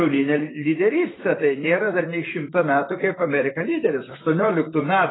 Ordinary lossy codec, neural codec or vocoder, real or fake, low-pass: AAC, 16 kbps; vocoder, 44.1 kHz, 128 mel bands, Pupu-Vocoder; fake; 7.2 kHz